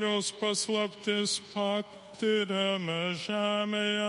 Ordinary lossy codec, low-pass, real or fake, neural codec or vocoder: MP3, 48 kbps; 10.8 kHz; fake; codec, 24 kHz, 1.2 kbps, DualCodec